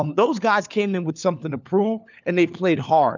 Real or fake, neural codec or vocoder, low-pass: fake; codec, 16 kHz, 4 kbps, FunCodec, trained on LibriTTS, 50 frames a second; 7.2 kHz